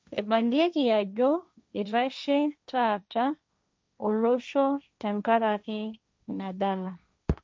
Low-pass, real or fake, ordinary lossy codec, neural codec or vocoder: none; fake; none; codec, 16 kHz, 1.1 kbps, Voila-Tokenizer